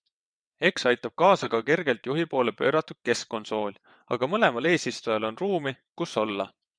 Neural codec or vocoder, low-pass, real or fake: vocoder, 22.05 kHz, 80 mel bands, WaveNeXt; 9.9 kHz; fake